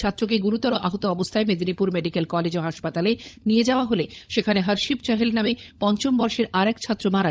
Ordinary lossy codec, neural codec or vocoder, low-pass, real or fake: none; codec, 16 kHz, 16 kbps, FunCodec, trained on LibriTTS, 50 frames a second; none; fake